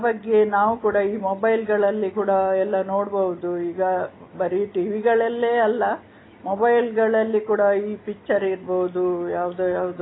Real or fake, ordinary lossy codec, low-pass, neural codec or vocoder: real; AAC, 16 kbps; 7.2 kHz; none